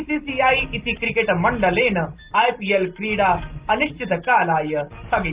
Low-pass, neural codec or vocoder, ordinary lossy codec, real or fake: 3.6 kHz; none; Opus, 32 kbps; real